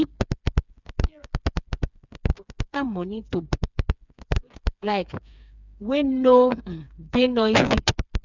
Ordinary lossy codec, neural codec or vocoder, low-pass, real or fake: none; codec, 16 kHz, 4 kbps, FreqCodec, smaller model; 7.2 kHz; fake